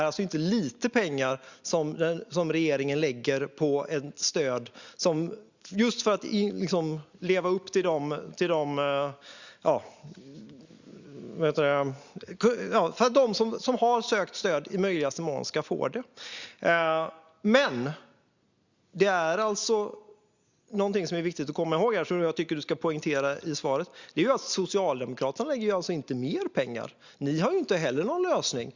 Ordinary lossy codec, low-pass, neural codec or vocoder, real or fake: Opus, 64 kbps; 7.2 kHz; none; real